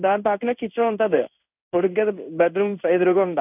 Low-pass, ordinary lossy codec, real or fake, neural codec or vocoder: 3.6 kHz; none; fake; codec, 16 kHz in and 24 kHz out, 1 kbps, XY-Tokenizer